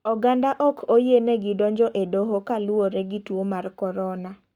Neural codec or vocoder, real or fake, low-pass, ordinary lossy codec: codec, 44.1 kHz, 7.8 kbps, Pupu-Codec; fake; 19.8 kHz; Opus, 64 kbps